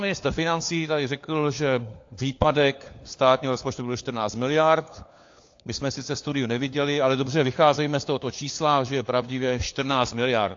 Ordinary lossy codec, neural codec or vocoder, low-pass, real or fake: AAC, 48 kbps; codec, 16 kHz, 4 kbps, FunCodec, trained on LibriTTS, 50 frames a second; 7.2 kHz; fake